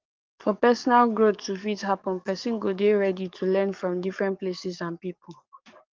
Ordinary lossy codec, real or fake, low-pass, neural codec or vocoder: Opus, 24 kbps; fake; 7.2 kHz; codec, 44.1 kHz, 7.8 kbps, DAC